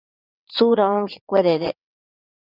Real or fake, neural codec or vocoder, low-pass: fake; vocoder, 22.05 kHz, 80 mel bands, WaveNeXt; 5.4 kHz